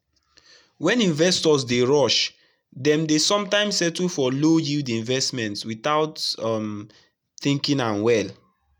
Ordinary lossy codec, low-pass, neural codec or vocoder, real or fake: none; 19.8 kHz; none; real